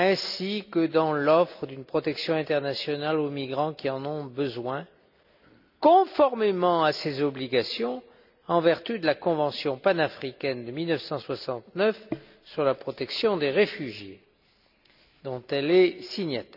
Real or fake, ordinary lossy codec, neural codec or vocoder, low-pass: real; none; none; 5.4 kHz